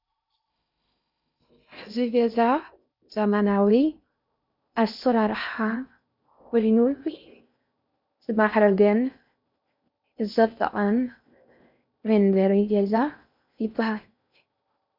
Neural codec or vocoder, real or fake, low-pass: codec, 16 kHz in and 24 kHz out, 0.6 kbps, FocalCodec, streaming, 2048 codes; fake; 5.4 kHz